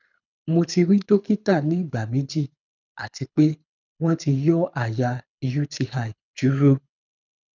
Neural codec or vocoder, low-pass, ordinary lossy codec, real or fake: codec, 24 kHz, 6 kbps, HILCodec; 7.2 kHz; none; fake